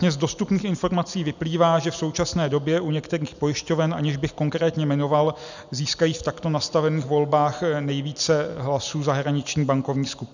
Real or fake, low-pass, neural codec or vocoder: real; 7.2 kHz; none